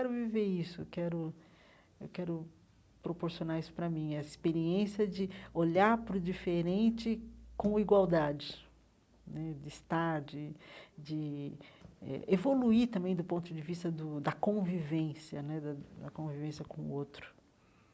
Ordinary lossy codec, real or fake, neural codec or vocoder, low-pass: none; real; none; none